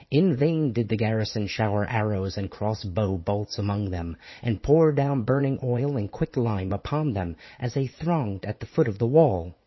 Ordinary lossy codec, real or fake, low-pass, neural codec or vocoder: MP3, 24 kbps; fake; 7.2 kHz; vocoder, 44.1 kHz, 80 mel bands, Vocos